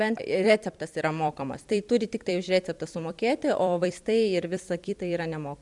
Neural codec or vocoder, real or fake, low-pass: vocoder, 44.1 kHz, 128 mel bands every 256 samples, BigVGAN v2; fake; 10.8 kHz